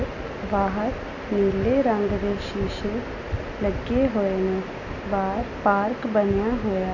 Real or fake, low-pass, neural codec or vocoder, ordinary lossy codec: real; 7.2 kHz; none; none